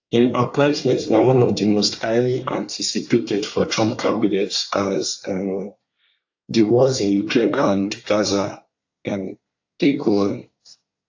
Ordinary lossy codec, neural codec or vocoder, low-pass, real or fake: AAC, 48 kbps; codec, 24 kHz, 1 kbps, SNAC; 7.2 kHz; fake